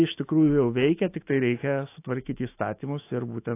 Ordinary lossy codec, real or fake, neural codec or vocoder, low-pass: AAC, 24 kbps; fake; autoencoder, 48 kHz, 128 numbers a frame, DAC-VAE, trained on Japanese speech; 3.6 kHz